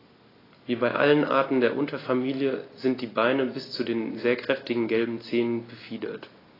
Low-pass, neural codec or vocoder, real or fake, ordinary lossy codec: 5.4 kHz; none; real; AAC, 24 kbps